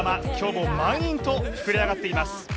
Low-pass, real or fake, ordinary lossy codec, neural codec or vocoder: none; real; none; none